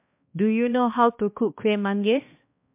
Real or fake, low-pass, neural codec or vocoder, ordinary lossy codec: fake; 3.6 kHz; codec, 16 kHz, 1 kbps, X-Codec, HuBERT features, trained on balanced general audio; MP3, 32 kbps